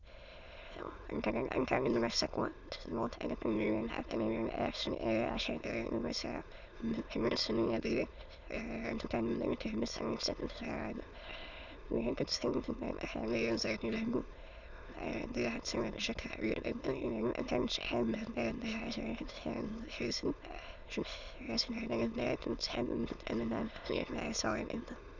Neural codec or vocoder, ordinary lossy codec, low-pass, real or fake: autoencoder, 22.05 kHz, a latent of 192 numbers a frame, VITS, trained on many speakers; none; 7.2 kHz; fake